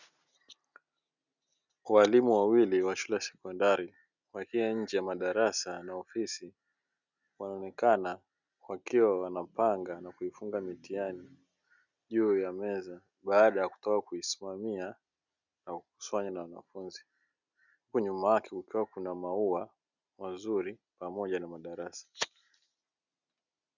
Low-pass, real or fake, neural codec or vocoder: 7.2 kHz; real; none